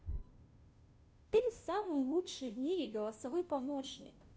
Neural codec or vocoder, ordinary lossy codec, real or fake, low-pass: codec, 16 kHz, 0.5 kbps, FunCodec, trained on Chinese and English, 25 frames a second; none; fake; none